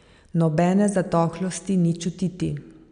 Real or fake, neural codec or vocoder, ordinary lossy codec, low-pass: real; none; none; 9.9 kHz